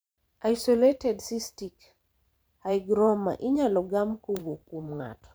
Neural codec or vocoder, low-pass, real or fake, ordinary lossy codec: vocoder, 44.1 kHz, 128 mel bands every 512 samples, BigVGAN v2; none; fake; none